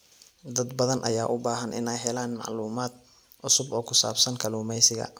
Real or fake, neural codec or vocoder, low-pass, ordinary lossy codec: real; none; none; none